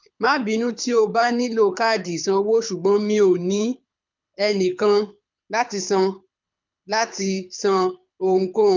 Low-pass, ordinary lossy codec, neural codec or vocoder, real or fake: 7.2 kHz; MP3, 64 kbps; codec, 24 kHz, 6 kbps, HILCodec; fake